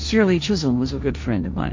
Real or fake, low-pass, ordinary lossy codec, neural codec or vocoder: fake; 7.2 kHz; MP3, 48 kbps; codec, 16 kHz, 1.1 kbps, Voila-Tokenizer